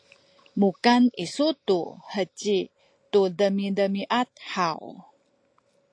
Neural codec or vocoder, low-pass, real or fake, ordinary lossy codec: none; 9.9 kHz; real; AAC, 48 kbps